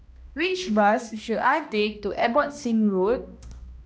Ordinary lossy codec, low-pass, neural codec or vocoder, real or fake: none; none; codec, 16 kHz, 1 kbps, X-Codec, HuBERT features, trained on balanced general audio; fake